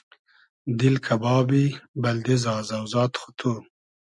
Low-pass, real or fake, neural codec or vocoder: 10.8 kHz; real; none